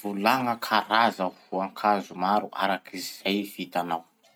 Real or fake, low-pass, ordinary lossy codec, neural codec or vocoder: real; none; none; none